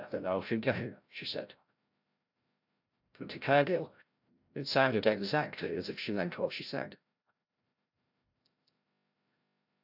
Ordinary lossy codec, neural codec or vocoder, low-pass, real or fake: AAC, 48 kbps; codec, 16 kHz, 0.5 kbps, FreqCodec, larger model; 5.4 kHz; fake